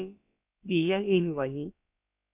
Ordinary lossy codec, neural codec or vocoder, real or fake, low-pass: MP3, 32 kbps; codec, 16 kHz, about 1 kbps, DyCAST, with the encoder's durations; fake; 3.6 kHz